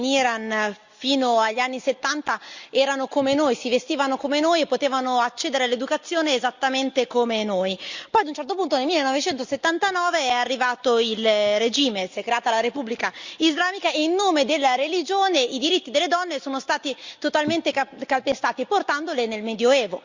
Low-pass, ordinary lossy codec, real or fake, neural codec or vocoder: 7.2 kHz; Opus, 64 kbps; real; none